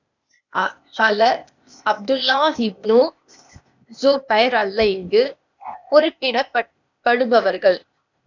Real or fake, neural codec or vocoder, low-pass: fake; codec, 16 kHz, 0.8 kbps, ZipCodec; 7.2 kHz